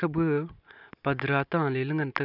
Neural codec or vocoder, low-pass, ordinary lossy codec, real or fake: none; 5.4 kHz; none; real